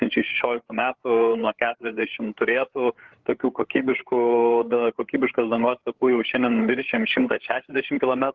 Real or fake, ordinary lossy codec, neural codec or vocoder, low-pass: fake; Opus, 16 kbps; codec, 16 kHz, 8 kbps, FreqCodec, larger model; 7.2 kHz